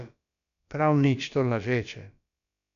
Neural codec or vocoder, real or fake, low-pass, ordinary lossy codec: codec, 16 kHz, about 1 kbps, DyCAST, with the encoder's durations; fake; 7.2 kHz; MP3, 96 kbps